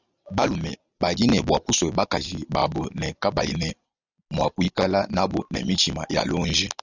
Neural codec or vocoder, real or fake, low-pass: none; real; 7.2 kHz